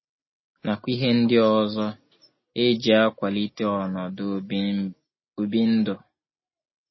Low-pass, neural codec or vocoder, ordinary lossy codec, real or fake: 7.2 kHz; none; MP3, 24 kbps; real